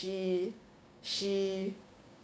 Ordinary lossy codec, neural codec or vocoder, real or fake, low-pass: none; codec, 16 kHz, 6 kbps, DAC; fake; none